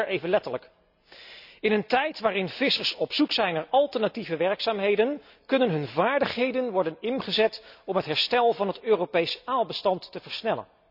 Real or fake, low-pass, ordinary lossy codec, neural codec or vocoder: real; 5.4 kHz; none; none